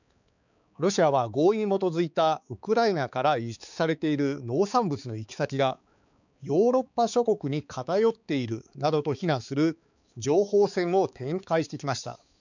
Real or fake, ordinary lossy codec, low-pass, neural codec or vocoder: fake; none; 7.2 kHz; codec, 16 kHz, 4 kbps, X-Codec, HuBERT features, trained on balanced general audio